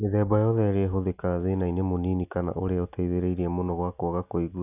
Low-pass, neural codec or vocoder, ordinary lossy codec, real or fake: 3.6 kHz; none; none; real